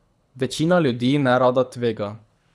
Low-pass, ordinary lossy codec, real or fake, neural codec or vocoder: none; none; fake; codec, 24 kHz, 6 kbps, HILCodec